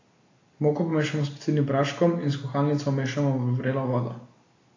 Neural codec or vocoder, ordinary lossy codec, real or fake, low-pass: none; AAC, 32 kbps; real; 7.2 kHz